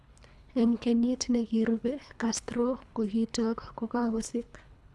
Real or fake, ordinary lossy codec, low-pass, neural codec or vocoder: fake; none; none; codec, 24 kHz, 3 kbps, HILCodec